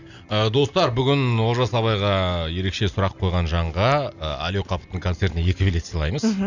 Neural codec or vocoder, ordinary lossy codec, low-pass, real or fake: none; none; 7.2 kHz; real